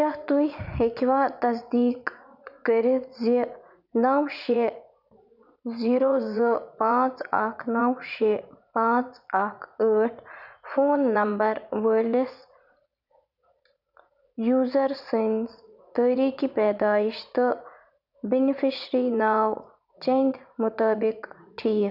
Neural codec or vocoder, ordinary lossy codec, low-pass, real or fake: vocoder, 44.1 kHz, 80 mel bands, Vocos; none; 5.4 kHz; fake